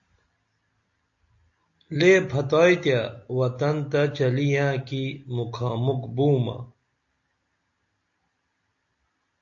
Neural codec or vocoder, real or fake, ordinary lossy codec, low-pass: none; real; AAC, 48 kbps; 7.2 kHz